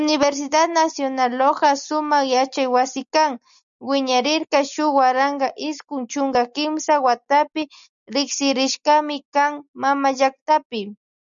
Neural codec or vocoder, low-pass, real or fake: none; 7.2 kHz; real